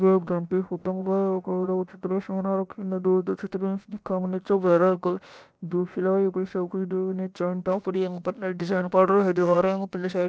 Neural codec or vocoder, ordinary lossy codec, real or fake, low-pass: codec, 16 kHz, about 1 kbps, DyCAST, with the encoder's durations; none; fake; none